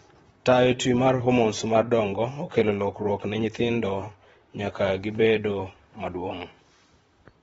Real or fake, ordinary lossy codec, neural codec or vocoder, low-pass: fake; AAC, 24 kbps; vocoder, 44.1 kHz, 128 mel bands, Pupu-Vocoder; 19.8 kHz